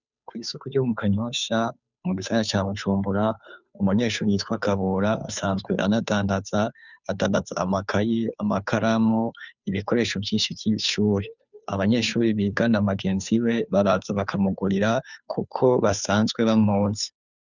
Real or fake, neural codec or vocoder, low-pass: fake; codec, 16 kHz, 2 kbps, FunCodec, trained on Chinese and English, 25 frames a second; 7.2 kHz